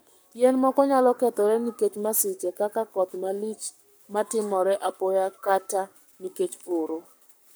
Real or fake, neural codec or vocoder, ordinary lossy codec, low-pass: fake; codec, 44.1 kHz, 7.8 kbps, Pupu-Codec; none; none